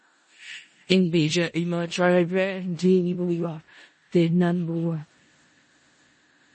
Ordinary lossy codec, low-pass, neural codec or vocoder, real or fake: MP3, 32 kbps; 10.8 kHz; codec, 16 kHz in and 24 kHz out, 0.4 kbps, LongCat-Audio-Codec, four codebook decoder; fake